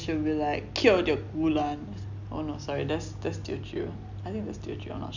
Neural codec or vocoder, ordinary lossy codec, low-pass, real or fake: none; none; 7.2 kHz; real